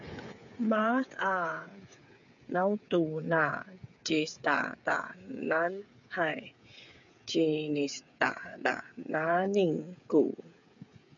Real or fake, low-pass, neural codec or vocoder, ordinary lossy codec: fake; 7.2 kHz; codec, 16 kHz, 8 kbps, FreqCodec, smaller model; MP3, 96 kbps